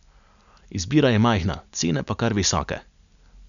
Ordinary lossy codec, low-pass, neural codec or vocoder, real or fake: none; 7.2 kHz; none; real